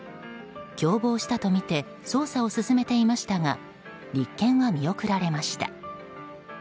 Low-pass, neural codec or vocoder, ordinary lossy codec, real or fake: none; none; none; real